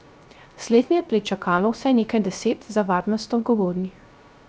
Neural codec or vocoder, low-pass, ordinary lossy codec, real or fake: codec, 16 kHz, 0.3 kbps, FocalCodec; none; none; fake